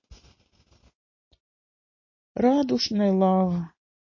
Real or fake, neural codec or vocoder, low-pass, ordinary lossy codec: real; none; 7.2 kHz; MP3, 32 kbps